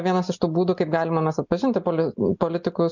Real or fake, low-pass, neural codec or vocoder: real; 7.2 kHz; none